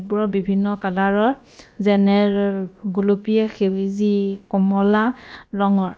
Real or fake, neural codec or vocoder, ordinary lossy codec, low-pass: fake; codec, 16 kHz, about 1 kbps, DyCAST, with the encoder's durations; none; none